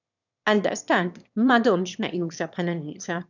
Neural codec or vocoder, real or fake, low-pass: autoencoder, 22.05 kHz, a latent of 192 numbers a frame, VITS, trained on one speaker; fake; 7.2 kHz